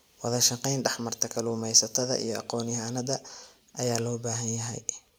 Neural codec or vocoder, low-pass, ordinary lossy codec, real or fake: none; none; none; real